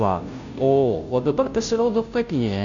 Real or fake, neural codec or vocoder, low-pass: fake; codec, 16 kHz, 0.5 kbps, FunCodec, trained on Chinese and English, 25 frames a second; 7.2 kHz